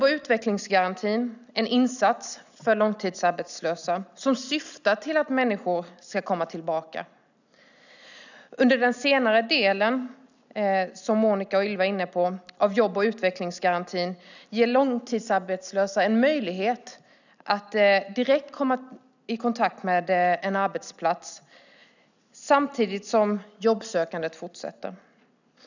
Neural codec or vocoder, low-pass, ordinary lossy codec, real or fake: none; 7.2 kHz; none; real